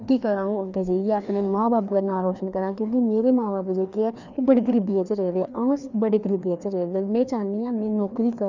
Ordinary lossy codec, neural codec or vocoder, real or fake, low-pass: none; codec, 16 kHz, 2 kbps, FreqCodec, larger model; fake; 7.2 kHz